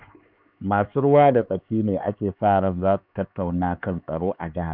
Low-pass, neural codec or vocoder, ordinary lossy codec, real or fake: 5.4 kHz; codec, 16 kHz, 4 kbps, X-Codec, HuBERT features, trained on LibriSpeech; none; fake